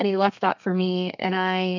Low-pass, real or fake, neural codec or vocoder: 7.2 kHz; fake; codec, 32 kHz, 1.9 kbps, SNAC